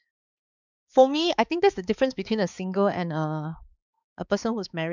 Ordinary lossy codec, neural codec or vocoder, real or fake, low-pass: none; codec, 16 kHz, 4 kbps, X-Codec, HuBERT features, trained on balanced general audio; fake; 7.2 kHz